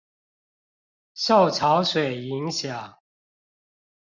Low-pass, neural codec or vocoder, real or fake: 7.2 kHz; none; real